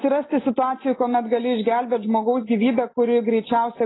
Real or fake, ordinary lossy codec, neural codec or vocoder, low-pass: real; AAC, 16 kbps; none; 7.2 kHz